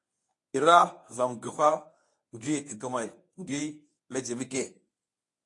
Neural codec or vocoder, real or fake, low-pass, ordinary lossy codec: codec, 24 kHz, 0.9 kbps, WavTokenizer, medium speech release version 1; fake; 10.8 kHz; AAC, 48 kbps